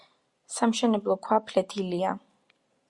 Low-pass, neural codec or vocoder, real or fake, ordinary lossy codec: 10.8 kHz; none; real; Opus, 64 kbps